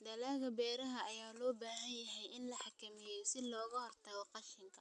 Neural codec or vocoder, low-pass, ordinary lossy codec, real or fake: none; 10.8 kHz; none; real